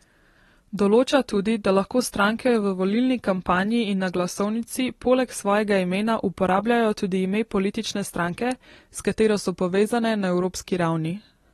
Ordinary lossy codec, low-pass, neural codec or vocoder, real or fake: AAC, 32 kbps; 19.8 kHz; none; real